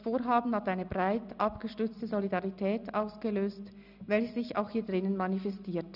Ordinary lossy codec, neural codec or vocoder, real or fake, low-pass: none; none; real; 5.4 kHz